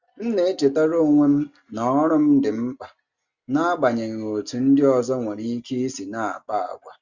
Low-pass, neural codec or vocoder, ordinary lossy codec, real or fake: 7.2 kHz; none; Opus, 64 kbps; real